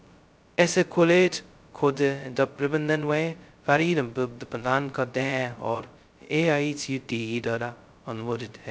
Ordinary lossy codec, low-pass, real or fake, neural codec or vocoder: none; none; fake; codec, 16 kHz, 0.2 kbps, FocalCodec